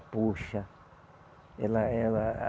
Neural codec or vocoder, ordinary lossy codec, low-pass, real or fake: none; none; none; real